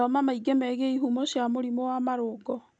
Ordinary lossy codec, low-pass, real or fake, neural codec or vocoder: none; 9.9 kHz; real; none